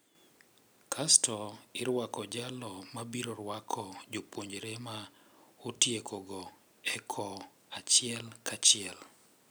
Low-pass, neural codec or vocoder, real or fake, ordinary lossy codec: none; none; real; none